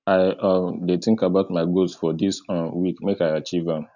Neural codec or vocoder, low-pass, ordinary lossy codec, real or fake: none; 7.2 kHz; none; real